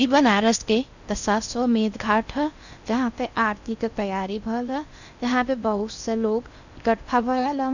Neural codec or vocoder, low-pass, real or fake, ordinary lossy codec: codec, 16 kHz in and 24 kHz out, 0.6 kbps, FocalCodec, streaming, 4096 codes; 7.2 kHz; fake; none